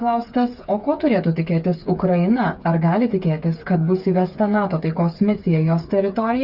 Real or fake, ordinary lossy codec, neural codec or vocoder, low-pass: fake; Opus, 64 kbps; codec, 16 kHz, 8 kbps, FreqCodec, smaller model; 5.4 kHz